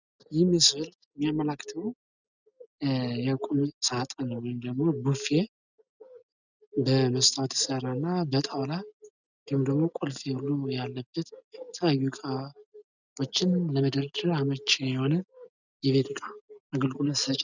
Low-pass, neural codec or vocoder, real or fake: 7.2 kHz; none; real